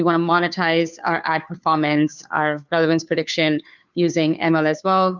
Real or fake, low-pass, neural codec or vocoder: fake; 7.2 kHz; codec, 16 kHz, 2 kbps, FunCodec, trained on Chinese and English, 25 frames a second